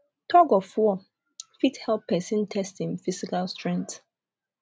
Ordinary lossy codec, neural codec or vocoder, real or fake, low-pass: none; none; real; none